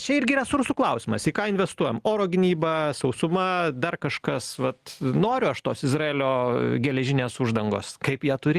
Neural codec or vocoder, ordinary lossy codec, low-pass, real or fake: none; Opus, 24 kbps; 14.4 kHz; real